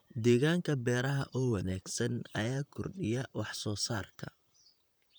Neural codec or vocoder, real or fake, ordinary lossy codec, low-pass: vocoder, 44.1 kHz, 128 mel bands, Pupu-Vocoder; fake; none; none